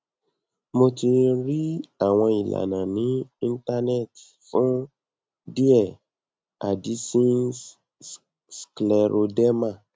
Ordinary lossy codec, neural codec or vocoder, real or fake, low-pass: none; none; real; none